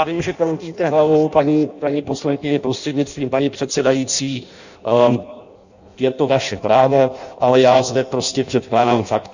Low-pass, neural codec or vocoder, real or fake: 7.2 kHz; codec, 16 kHz in and 24 kHz out, 0.6 kbps, FireRedTTS-2 codec; fake